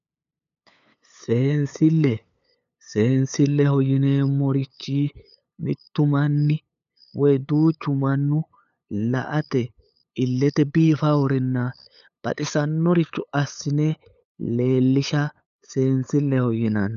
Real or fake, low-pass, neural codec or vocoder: fake; 7.2 kHz; codec, 16 kHz, 8 kbps, FunCodec, trained on LibriTTS, 25 frames a second